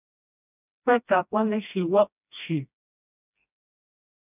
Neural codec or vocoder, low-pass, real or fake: codec, 16 kHz, 1 kbps, FreqCodec, smaller model; 3.6 kHz; fake